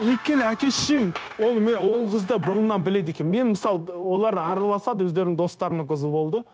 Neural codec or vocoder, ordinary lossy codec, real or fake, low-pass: codec, 16 kHz, 0.9 kbps, LongCat-Audio-Codec; none; fake; none